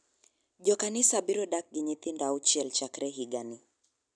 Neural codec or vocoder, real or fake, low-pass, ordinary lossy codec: none; real; 9.9 kHz; none